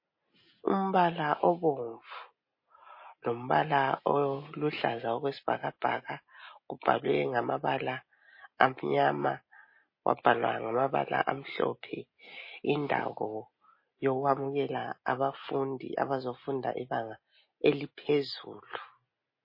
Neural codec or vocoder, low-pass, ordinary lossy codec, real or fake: none; 5.4 kHz; MP3, 24 kbps; real